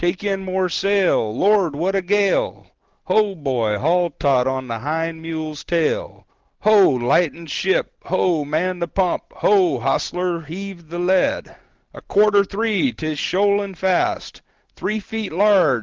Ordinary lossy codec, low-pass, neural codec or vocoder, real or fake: Opus, 16 kbps; 7.2 kHz; none; real